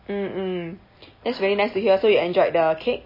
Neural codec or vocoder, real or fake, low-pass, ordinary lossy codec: none; real; 5.4 kHz; MP3, 24 kbps